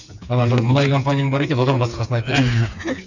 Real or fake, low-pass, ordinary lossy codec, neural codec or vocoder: fake; 7.2 kHz; none; codec, 16 kHz, 4 kbps, FreqCodec, smaller model